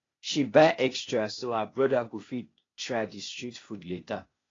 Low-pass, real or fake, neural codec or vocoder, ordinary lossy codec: 7.2 kHz; fake; codec, 16 kHz, 0.8 kbps, ZipCodec; AAC, 32 kbps